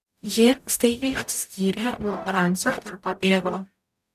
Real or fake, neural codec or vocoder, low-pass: fake; codec, 44.1 kHz, 0.9 kbps, DAC; 14.4 kHz